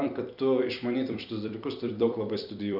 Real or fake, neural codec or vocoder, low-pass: fake; vocoder, 44.1 kHz, 128 mel bands every 256 samples, BigVGAN v2; 5.4 kHz